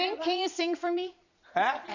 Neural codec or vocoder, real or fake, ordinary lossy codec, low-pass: vocoder, 22.05 kHz, 80 mel bands, Vocos; fake; none; 7.2 kHz